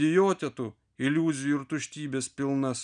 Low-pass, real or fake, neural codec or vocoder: 9.9 kHz; real; none